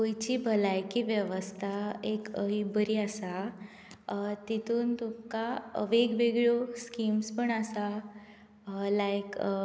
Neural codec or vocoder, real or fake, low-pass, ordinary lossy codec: none; real; none; none